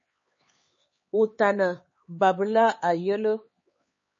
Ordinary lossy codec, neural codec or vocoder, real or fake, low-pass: MP3, 32 kbps; codec, 16 kHz, 4 kbps, X-Codec, HuBERT features, trained on LibriSpeech; fake; 7.2 kHz